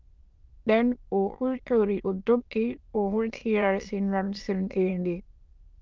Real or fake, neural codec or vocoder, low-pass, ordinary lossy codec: fake; autoencoder, 22.05 kHz, a latent of 192 numbers a frame, VITS, trained on many speakers; 7.2 kHz; Opus, 32 kbps